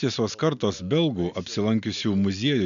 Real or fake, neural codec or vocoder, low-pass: real; none; 7.2 kHz